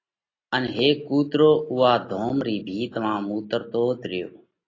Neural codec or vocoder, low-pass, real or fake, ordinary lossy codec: none; 7.2 kHz; real; AAC, 32 kbps